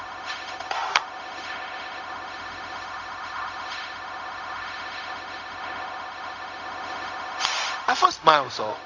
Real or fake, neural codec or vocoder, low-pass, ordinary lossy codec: fake; codec, 16 kHz, 0.4 kbps, LongCat-Audio-Codec; 7.2 kHz; none